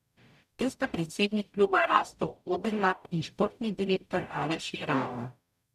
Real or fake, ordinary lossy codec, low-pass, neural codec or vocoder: fake; none; 14.4 kHz; codec, 44.1 kHz, 0.9 kbps, DAC